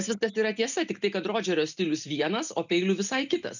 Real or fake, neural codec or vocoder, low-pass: real; none; 7.2 kHz